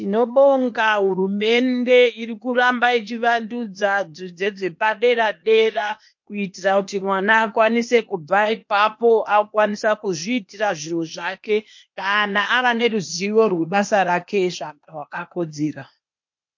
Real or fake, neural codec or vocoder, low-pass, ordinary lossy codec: fake; codec, 16 kHz, 0.8 kbps, ZipCodec; 7.2 kHz; MP3, 48 kbps